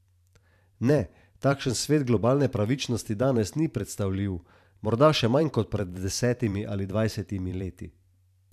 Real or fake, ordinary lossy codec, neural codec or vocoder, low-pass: fake; none; vocoder, 44.1 kHz, 128 mel bands every 512 samples, BigVGAN v2; 14.4 kHz